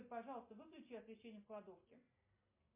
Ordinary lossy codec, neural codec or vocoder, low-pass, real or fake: MP3, 24 kbps; none; 3.6 kHz; real